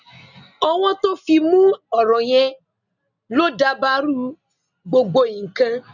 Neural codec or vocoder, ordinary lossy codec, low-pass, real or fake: none; none; 7.2 kHz; real